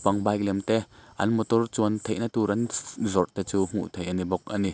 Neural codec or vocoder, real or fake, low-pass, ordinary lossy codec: none; real; none; none